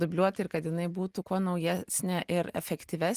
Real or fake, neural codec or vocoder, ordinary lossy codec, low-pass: real; none; Opus, 24 kbps; 14.4 kHz